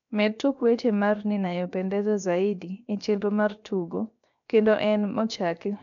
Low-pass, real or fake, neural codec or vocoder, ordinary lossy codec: 7.2 kHz; fake; codec, 16 kHz, 0.7 kbps, FocalCodec; none